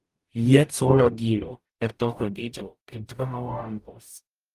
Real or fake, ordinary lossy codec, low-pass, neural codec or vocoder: fake; Opus, 32 kbps; 14.4 kHz; codec, 44.1 kHz, 0.9 kbps, DAC